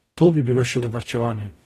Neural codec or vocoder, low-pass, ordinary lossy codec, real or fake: codec, 44.1 kHz, 0.9 kbps, DAC; 14.4 kHz; AAC, 48 kbps; fake